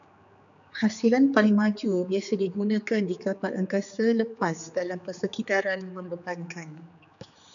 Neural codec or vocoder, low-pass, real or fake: codec, 16 kHz, 4 kbps, X-Codec, HuBERT features, trained on general audio; 7.2 kHz; fake